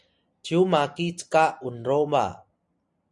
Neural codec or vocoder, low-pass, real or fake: none; 10.8 kHz; real